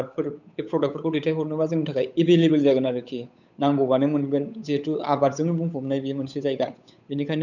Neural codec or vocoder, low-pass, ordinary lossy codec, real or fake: codec, 16 kHz, 8 kbps, FunCodec, trained on Chinese and English, 25 frames a second; 7.2 kHz; none; fake